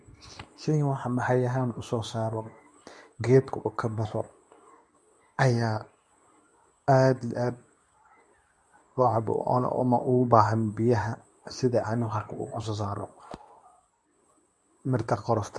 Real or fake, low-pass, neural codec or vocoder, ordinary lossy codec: fake; none; codec, 24 kHz, 0.9 kbps, WavTokenizer, medium speech release version 2; none